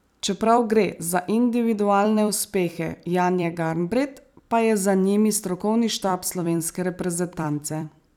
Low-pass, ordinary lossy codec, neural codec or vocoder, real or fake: 19.8 kHz; none; vocoder, 44.1 kHz, 128 mel bands every 256 samples, BigVGAN v2; fake